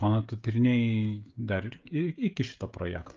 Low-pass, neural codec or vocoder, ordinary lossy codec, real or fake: 7.2 kHz; codec, 16 kHz, 16 kbps, FreqCodec, smaller model; Opus, 24 kbps; fake